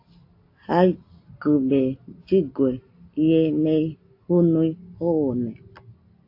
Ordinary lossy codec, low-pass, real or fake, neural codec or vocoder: AAC, 32 kbps; 5.4 kHz; real; none